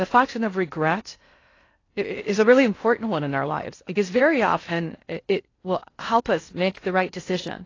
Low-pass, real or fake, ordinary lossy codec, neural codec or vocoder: 7.2 kHz; fake; AAC, 32 kbps; codec, 16 kHz in and 24 kHz out, 0.6 kbps, FocalCodec, streaming, 2048 codes